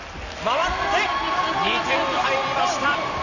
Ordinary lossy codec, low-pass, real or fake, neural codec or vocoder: none; 7.2 kHz; real; none